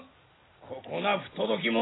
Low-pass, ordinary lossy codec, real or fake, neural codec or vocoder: 7.2 kHz; AAC, 16 kbps; real; none